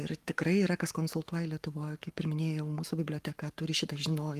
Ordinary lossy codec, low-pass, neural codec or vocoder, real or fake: Opus, 32 kbps; 14.4 kHz; codec, 44.1 kHz, 7.8 kbps, Pupu-Codec; fake